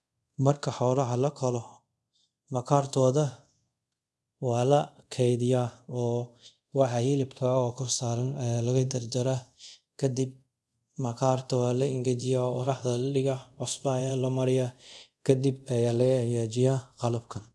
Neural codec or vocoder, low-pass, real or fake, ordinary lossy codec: codec, 24 kHz, 0.5 kbps, DualCodec; none; fake; none